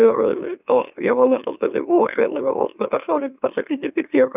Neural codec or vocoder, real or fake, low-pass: autoencoder, 44.1 kHz, a latent of 192 numbers a frame, MeloTTS; fake; 3.6 kHz